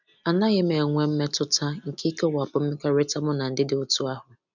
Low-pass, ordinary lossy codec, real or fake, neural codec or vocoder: 7.2 kHz; none; real; none